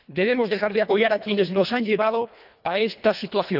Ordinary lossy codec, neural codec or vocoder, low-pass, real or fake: none; codec, 24 kHz, 1.5 kbps, HILCodec; 5.4 kHz; fake